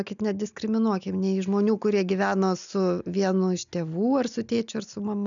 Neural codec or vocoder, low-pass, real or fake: none; 7.2 kHz; real